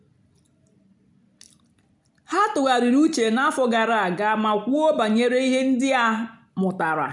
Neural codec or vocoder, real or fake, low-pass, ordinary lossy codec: none; real; 10.8 kHz; none